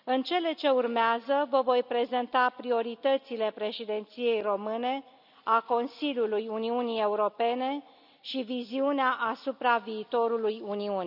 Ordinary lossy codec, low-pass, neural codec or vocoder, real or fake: none; 5.4 kHz; none; real